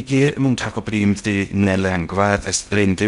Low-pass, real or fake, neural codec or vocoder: 10.8 kHz; fake; codec, 16 kHz in and 24 kHz out, 0.6 kbps, FocalCodec, streaming, 2048 codes